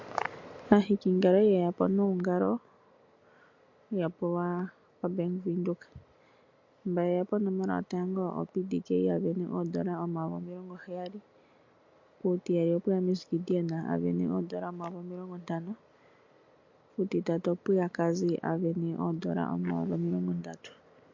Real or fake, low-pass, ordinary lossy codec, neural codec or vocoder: real; 7.2 kHz; MP3, 48 kbps; none